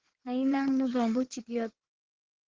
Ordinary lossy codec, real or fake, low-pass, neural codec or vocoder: Opus, 16 kbps; fake; 7.2 kHz; codec, 16 kHz in and 24 kHz out, 2.2 kbps, FireRedTTS-2 codec